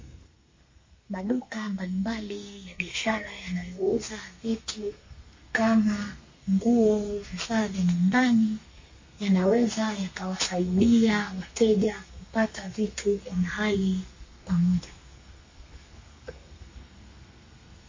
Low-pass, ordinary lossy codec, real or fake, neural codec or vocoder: 7.2 kHz; MP3, 32 kbps; fake; codec, 32 kHz, 1.9 kbps, SNAC